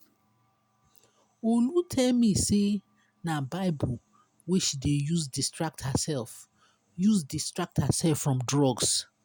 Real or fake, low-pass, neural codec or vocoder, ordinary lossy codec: real; none; none; none